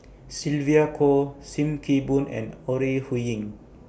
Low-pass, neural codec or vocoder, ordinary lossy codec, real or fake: none; none; none; real